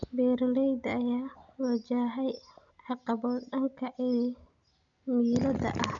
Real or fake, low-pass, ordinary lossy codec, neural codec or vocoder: real; 7.2 kHz; none; none